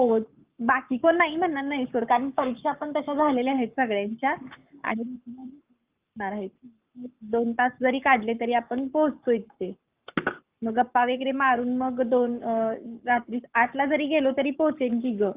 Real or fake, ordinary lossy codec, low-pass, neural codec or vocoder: fake; Opus, 24 kbps; 3.6 kHz; codec, 44.1 kHz, 7.8 kbps, Pupu-Codec